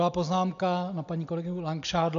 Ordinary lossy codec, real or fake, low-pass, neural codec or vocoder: MP3, 64 kbps; real; 7.2 kHz; none